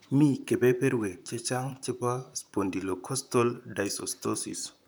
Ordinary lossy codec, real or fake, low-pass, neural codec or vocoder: none; fake; none; vocoder, 44.1 kHz, 128 mel bands, Pupu-Vocoder